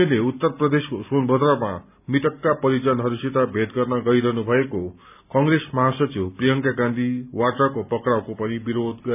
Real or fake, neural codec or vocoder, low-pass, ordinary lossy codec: real; none; 3.6 kHz; none